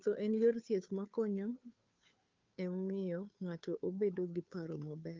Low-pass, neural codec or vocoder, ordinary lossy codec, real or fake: 7.2 kHz; codec, 16 kHz, 2 kbps, FunCodec, trained on Chinese and English, 25 frames a second; Opus, 24 kbps; fake